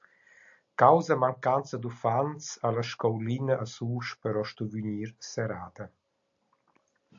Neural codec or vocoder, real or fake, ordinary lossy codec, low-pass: none; real; AAC, 64 kbps; 7.2 kHz